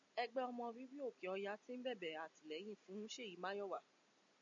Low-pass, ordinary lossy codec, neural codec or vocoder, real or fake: 7.2 kHz; MP3, 64 kbps; none; real